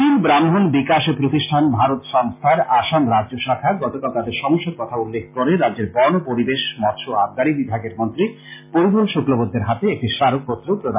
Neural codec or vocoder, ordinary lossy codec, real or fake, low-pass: none; MP3, 24 kbps; real; 3.6 kHz